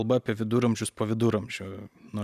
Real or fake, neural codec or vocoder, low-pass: real; none; 14.4 kHz